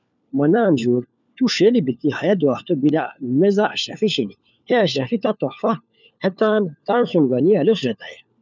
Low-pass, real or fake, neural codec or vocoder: 7.2 kHz; fake; codec, 16 kHz, 4 kbps, FunCodec, trained on LibriTTS, 50 frames a second